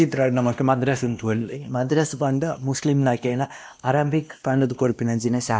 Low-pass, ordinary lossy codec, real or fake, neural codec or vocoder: none; none; fake; codec, 16 kHz, 1 kbps, X-Codec, WavLM features, trained on Multilingual LibriSpeech